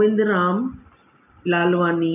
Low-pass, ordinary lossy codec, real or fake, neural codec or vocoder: 3.6 kHz; none; real; none